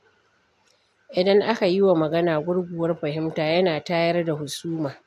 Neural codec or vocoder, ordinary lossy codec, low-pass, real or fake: none; AAC, 96 kbps; 14.4 kHz; real